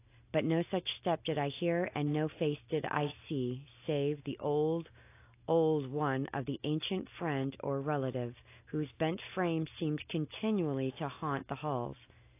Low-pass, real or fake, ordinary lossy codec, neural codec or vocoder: 3.6 kHz; real; AAC, 24 kbps; none